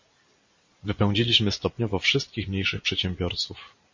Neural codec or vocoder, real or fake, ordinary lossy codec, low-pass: none; real; MP3, 32 kbps; 7.2 kHz